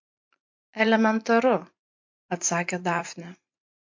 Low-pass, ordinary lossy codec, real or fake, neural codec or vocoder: 7.2 kHz; MP3, 48 kbps; fake; vocoder, 44.1 kHz, 128 mel bands every 512 samples, BigVGAN v2